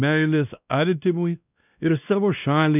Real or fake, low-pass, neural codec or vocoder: fake; 3.6 kHz; codec, 16 kHz, 1 kbps, X-Codec, WavLM features, trained on Multilingual LibriSpeech